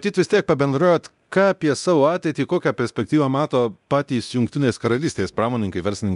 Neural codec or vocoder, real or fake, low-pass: codec, 24 kHz, 0.9 kbps, DualCodec; fake; 10.8 kHz